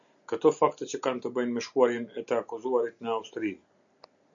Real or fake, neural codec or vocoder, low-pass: real; none; 7.2 kHz